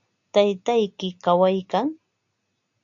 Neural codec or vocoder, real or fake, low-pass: none; real; 7.2 kHz